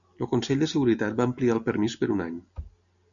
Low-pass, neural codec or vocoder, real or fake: 7.2 kHz; none; real